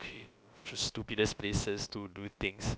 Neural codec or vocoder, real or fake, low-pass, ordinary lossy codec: codec, 16 kHz, about 1 kbps, DyCAST, with the encoder's durations; fake; none; none